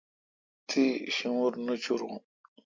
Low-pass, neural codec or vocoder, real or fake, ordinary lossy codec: 7.2 kHz; none; real; MP3, 48 kbps